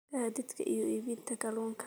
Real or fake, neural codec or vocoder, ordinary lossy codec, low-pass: real; none; none; none